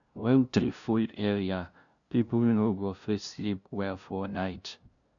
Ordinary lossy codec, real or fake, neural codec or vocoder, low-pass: none; fake; codec, 16 kHz, 0.5 kbps, FunCodec, trained on LibriTTS, 25 frames a second; 7.2 kHz